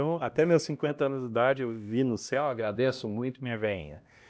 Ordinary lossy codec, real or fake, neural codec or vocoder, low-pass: none; fake; codec, 16 kHz, 1 kbps, X-Codec, HuBERT features, trained on LibriSpeech; none